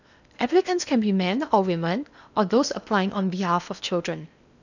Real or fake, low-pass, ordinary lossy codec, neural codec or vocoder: fake; 7.2 kHz; none; codec, 16 kHz in and 24 kHz out, 0.8 kbps, FocalCodec, streaming, 65536 codes